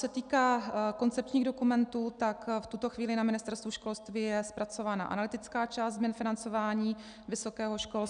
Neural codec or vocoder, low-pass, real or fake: none; 9.9 kHz; real